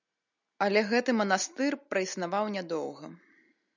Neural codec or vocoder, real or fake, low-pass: none; real; 7.2 kHz